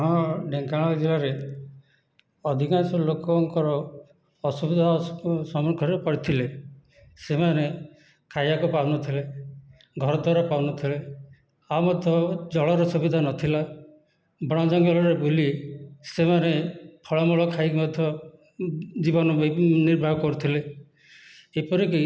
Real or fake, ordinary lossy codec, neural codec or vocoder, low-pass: real; none; none; none